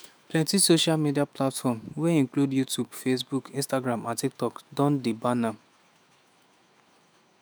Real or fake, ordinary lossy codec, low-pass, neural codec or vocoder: fake; none; none; autoencoder, 48 kHz, 128 numbers a frame, DAC-VAE, trained on Japanese speech